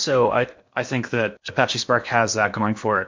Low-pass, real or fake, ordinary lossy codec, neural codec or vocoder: 7.2 kHz; fake; MP3, 48 kbps; codec, 16 kHz in and 24 kHz out, 0.8 kbps, FocalCodec, streaming, 65536 codes